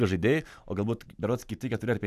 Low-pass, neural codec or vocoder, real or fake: 14.4 kHz; vocoder, 44.1 kHz, 128 mel bands every 512 samples, BigVGAN v2; fake